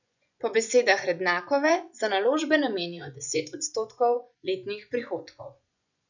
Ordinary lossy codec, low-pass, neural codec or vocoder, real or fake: none; 7.2 kHz; vocoder, 24 kHz, 100 mel bands, Vocos; fake